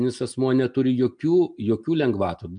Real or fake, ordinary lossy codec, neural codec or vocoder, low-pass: real; MP3, 96 kbps; none; 9.9 kHz